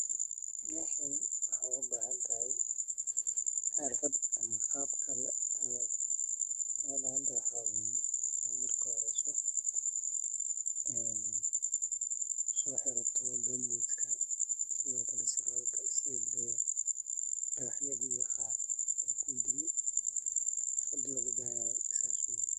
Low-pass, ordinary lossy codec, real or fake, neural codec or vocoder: 14.4 kHz; none; fake; codec, 44.1 kHz, 7.8 kbps, DAC